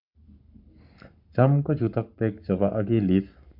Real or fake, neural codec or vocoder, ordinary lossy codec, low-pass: fake; codec, 44.1 kHz, 7.8 kbps, Pupu-Codec; none; 5.4 kHz